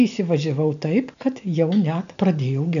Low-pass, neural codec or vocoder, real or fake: 7.2 kHz; none; real